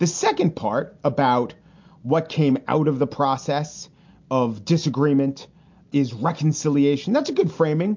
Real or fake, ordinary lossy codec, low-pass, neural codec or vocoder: real; MP3, 48 kbps; 7.2 kHz; none